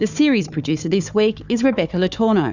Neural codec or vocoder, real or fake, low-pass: codec, 16 kHz, 4 kbps, FunCodec, trained on Chinese and English, 50 frames a second; fake; 7.2 kHz